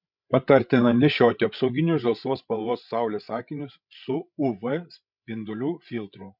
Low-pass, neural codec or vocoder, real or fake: 5.4 kHz; codec, 16 kHz, 16 kbps, FreqCodec, larger model; fake